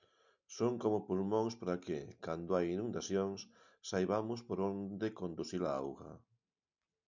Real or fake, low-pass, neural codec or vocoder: real; 7.2 kHz; none